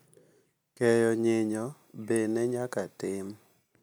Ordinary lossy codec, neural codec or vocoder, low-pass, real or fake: none; none; none; real